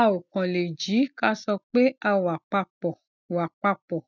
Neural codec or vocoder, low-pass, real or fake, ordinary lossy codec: none; 7.2 kHz; real; none